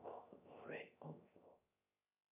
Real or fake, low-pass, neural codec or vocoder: fake; 3.6 kHz; codec, 16 kHz, 0.3 kbps, FocalCodec